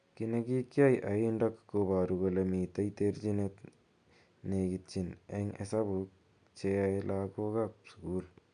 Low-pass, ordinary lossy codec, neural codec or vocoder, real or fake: 9.9 kHz; MP3, 96 kbps; none; real